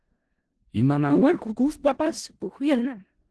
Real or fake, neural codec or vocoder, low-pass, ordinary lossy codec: fake; codec, 16 kHz in and 24 kHz out, 0.4 kbps, LongCat-Audio-Codec, four codebook decoder; 10.8 kHz; Opus, 16 kbps